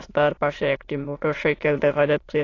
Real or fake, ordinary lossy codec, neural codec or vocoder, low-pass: fake; AAC, 32 kbps; autoencoder, 22.05 kHz, a latent of 192 numbers a frame, VITS, trained on many speakers; 7.2 kHz